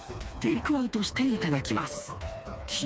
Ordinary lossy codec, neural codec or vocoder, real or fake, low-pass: none; codec, 16 kHz, 2 kbps, FreqCodec, smaller model; fake; none